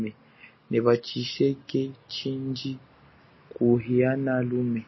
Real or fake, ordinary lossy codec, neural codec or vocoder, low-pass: real; MP3, 24 kbps; none; 7.2 kHz